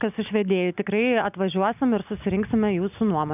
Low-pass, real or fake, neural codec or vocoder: 3.6 kHz; real; none